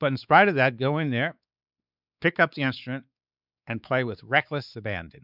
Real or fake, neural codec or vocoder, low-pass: fake; codec, 16 kHz, 4 kbps, X-Codec, WavLM features, trained on Multilingual LibriSpeech; 5.4 kHz